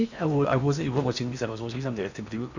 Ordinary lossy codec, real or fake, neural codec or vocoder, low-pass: none; fake; codec, 16 kHz in and 24 kHz out, 0.8 kbps, FocalCodec, streaming, 65536 codes; 7.2 kHz